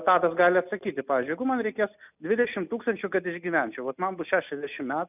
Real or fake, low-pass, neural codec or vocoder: real; 3.6 kHz; none